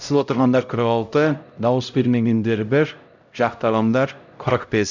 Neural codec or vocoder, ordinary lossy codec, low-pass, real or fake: codec, 16 kHz, 0.5 kbps, X-Codec, HuBERT features, trained on LibriSpeech; none; 7.2 kHz; fake